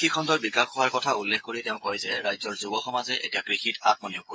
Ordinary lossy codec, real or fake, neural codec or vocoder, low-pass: none; fake; codec, 16 kHz, 8 kbps, FreqCodec, smaller model; none